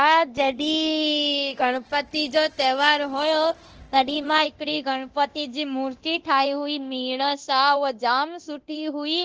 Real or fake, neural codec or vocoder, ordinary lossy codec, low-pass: fake; codec, 24 kHz, 0.9 kbps, DualCodec; Opus, 16 kbps; 7.2 kHz